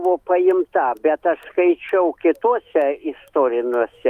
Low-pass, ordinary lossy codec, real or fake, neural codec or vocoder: 14.4 kHz; Opus, 32 kbps; fake; autoencoder, 48 kHz, 128 numbers a frame, DAC-VAE, trained on Japanese speech